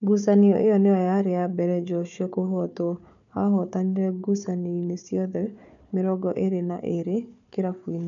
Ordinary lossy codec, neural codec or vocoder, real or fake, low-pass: none; codec, 16 kHz, 4 kbps, FunCodec, trained on Chinese and English, 50 frames a second; fake; 7.2 kHz